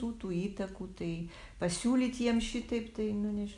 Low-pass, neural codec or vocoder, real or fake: 10.8 kHz; none; real